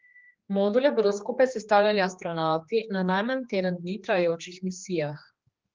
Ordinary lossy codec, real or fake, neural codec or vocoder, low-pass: Opus, 32 kbps; fake; codec, 16 kHz, 2 kbps, X-Codec, HuBERT features, trained on general audio; 7.2 kHz